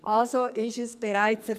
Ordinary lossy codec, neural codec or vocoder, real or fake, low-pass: none; codec, 44.1 kHz, 2.6 kbps, SNAC; fake; 14.4 kHz